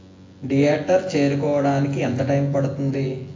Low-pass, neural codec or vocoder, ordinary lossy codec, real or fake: 7.2 kHz; vocoder, 24 kHz, 100 mel bands, Vocos; AAC, 32 kbps; fake